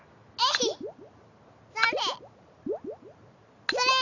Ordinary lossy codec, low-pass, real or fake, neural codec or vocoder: none; 7.2 kHz; real; none